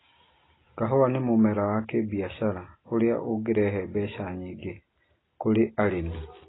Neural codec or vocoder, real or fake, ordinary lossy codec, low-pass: none; real; AAC, 16 kbps; 7.2 kHz